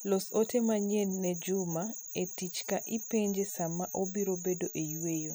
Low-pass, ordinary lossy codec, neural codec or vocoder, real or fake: none; none; none; real